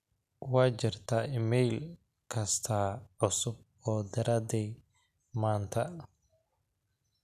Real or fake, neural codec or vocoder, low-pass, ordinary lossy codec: real; none; 14.4 kHz; none